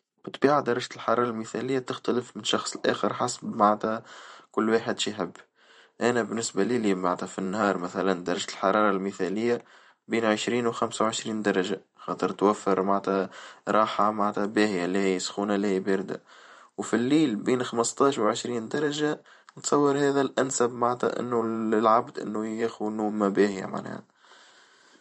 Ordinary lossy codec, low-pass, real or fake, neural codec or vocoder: MP3, 48 kbps; 19.8 kHz; fake; vocoder, 44.1 kHz, 128 mel bands every 512 samples, BigVGAN v2